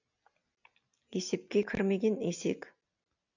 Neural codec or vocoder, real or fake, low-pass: none; real; 7.2 kHz